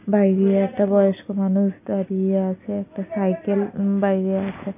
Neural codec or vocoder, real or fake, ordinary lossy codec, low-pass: none; real; none; 3.6 kHz